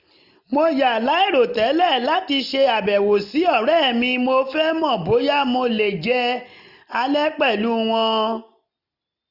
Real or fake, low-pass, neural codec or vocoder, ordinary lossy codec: real; 5.4 kHz; none; none